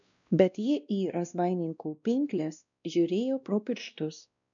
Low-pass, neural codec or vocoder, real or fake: 7.2 kHz; codec, 16 kHz, 1 kbps, X-Codec, WavLM features, trained on Multilingual LibriSpeech; fake